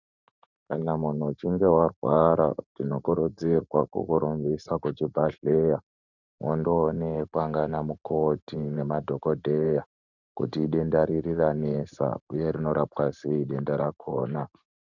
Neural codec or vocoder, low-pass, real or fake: vocoder, 44.1 kHz, 128 mel bands every 512 samples, BigVGAN v2; 7.2 kHz; fake